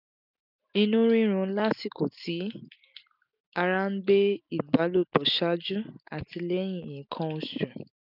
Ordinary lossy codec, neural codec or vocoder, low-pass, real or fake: none; none; 5.4 kHz; real